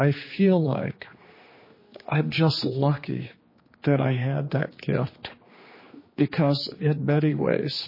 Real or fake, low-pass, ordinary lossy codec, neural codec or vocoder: fake; 5.4 kHz; MP3, 24 kbps; codec, 16 kHz, 2 kbps, X-Codec, HuBERT features, trained on general audio